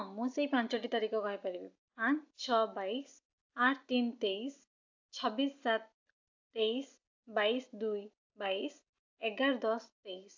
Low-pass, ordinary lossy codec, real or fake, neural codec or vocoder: 7.2 kHz; AAC, 48 kbps; real; none